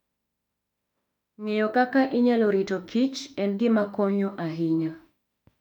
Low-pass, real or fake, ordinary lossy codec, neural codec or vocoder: 19.8 kHz; fake; none; autoencoder, 48 kHz, 32 numbers a frame, DAC-VAE, trained on Japanese speech